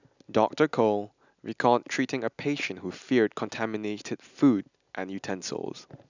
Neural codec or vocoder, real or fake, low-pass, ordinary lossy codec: none; real; 7.2 kHz; none